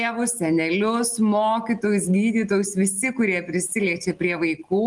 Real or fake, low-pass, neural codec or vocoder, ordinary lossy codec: real; 10.8 kHz; none; Opus, 24 kbps